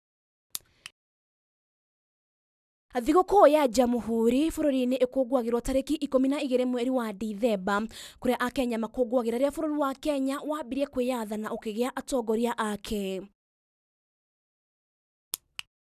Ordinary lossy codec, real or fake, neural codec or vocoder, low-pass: none; real; none; 14.4 kHz